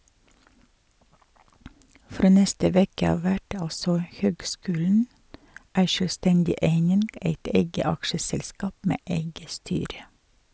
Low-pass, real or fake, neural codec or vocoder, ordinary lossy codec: none; real; none; none